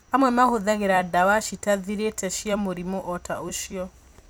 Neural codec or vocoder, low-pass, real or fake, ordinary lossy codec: vocoder, 44.1 kHz, 128 mel bands every 256 samples, BigVGAN v2; none; fake; none